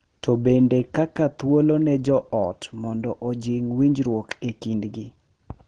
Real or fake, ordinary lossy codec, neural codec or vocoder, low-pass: real; Opus, 16 kbps; none; 9.9 kHz